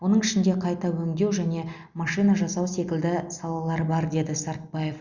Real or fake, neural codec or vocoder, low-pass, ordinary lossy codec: real; none; 7.2 kHz; Opus, 64 kbps